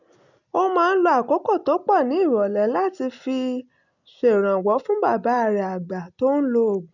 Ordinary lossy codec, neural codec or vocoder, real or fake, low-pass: none; none; real; 7.2 kHz